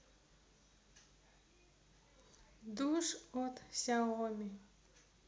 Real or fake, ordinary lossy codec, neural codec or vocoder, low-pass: real; none; none; none